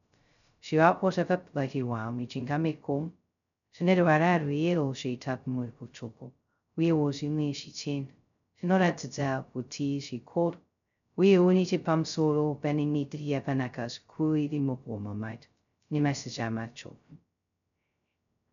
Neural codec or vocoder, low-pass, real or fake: codec, 16 kHz, 0.2 kbps, FocalCodec; 7.2 kHz; fake